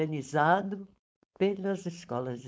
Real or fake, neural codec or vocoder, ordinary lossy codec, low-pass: fake; codec, 16 kHz, 4.8 kbps, FACodec; none; none